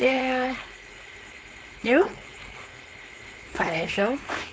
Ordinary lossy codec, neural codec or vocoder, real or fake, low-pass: none; codec, 16 kHz, 4.8 kbps, FACodec; fake; none